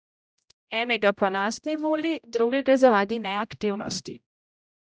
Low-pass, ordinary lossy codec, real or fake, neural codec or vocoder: none; none; fake; codec, 16 kHz, 0.5 kbps, X-Codec, HuBERT features, trained on general audio